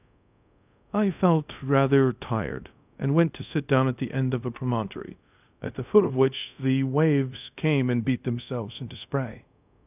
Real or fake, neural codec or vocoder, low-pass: fake; codec, 24 kHz, 0.5 kbps, DualCodec; 3.6 kHz